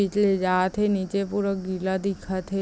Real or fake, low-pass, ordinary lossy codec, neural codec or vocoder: real; none; none; none